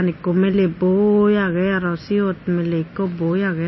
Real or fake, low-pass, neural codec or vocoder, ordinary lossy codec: real; 7.2 kHz; none; MP3, 24 kbps